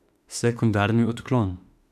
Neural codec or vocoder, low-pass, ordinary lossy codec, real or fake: autoencoder, 48 kHz, 32 numbers a frame, DAC-VAE, trained on Japanese speech; 14.4 kHz; none; fake